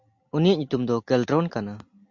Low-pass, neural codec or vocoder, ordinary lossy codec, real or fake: 7.2 kHz; none; MP3, 48 kbps; real